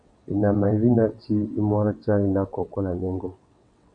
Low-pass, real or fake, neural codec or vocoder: 9.9 kHz; fake; vocoder, 22.05 kHz, 80 mel bands, WaveNeXt